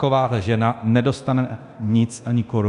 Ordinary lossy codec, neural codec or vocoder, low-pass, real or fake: MP3, 96 kbps; codec, 24 kHz, 0.9 kbps, DualCodec; 10.8 kHz; fake